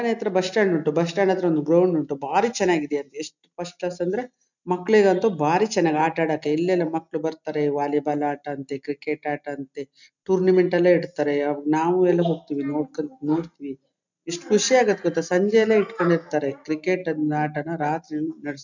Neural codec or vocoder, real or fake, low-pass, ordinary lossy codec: none; real; 7.2 kHz; none